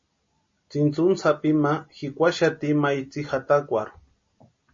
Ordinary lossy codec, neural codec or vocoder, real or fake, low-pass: MP3, 32 kbps; none; real; 7.2 kHz